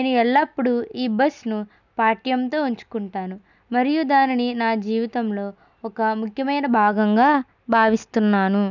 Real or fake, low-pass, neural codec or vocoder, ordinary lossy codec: real; 7.2 kHz; none; none